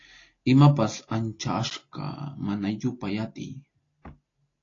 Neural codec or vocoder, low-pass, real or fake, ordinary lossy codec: none; 7.2 kHz; real; AAC, 32 kbps